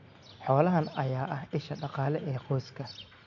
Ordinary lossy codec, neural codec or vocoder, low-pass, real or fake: none; none; 7.2 kHz; real